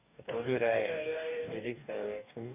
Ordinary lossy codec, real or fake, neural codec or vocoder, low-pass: none; fake; codec, 44.1 kHz, 2.6 kbps, DAC; 3.6 kHz